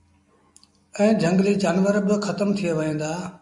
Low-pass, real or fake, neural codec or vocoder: 10.8 kHz; real; none